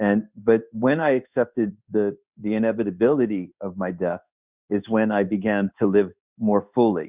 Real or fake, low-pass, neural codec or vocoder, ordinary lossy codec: fake; 3.6 kHz; codec, 16 kHz in and 24 kHz out, 1 kbps, XY-Tokenizer; Opus, 64 kbps